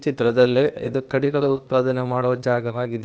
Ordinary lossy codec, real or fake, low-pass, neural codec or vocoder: none; fake; none; codec, 16 kHz, 0.8 kbps, ZipCodec